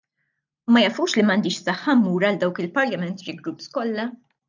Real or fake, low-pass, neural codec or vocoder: real; 7.2 kHz; none